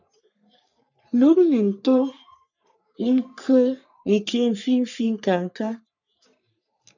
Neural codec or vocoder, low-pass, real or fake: codec, 44.1 kHz, 3.4 kbps, Pupu-Codec; 7.2 kHz; fake